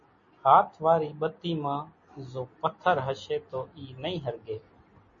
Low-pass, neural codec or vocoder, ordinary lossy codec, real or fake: 7.2 kHz; none; MP3, 32 kbps; real